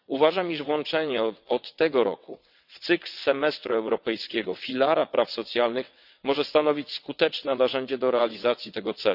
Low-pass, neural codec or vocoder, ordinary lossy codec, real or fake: 5.4 kHz; vocoder, 22.05 kHz, 80 mel bands, WaveNeXt; none; fake